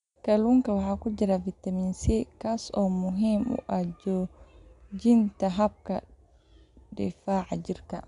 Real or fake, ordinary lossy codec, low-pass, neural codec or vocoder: real; none; 10.8 kHz; none